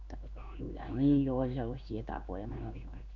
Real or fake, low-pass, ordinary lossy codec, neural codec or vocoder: fake; 7.2 kHz; none; codec, 16 kHz in and 24 kHz out, 1 kbps, XY-Tokenizer